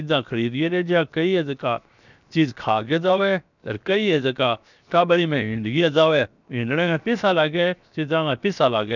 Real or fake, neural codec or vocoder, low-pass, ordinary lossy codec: fake; codec, 16 kHz, 0.7 kbps, FocalCodec; 7.2 kHz; none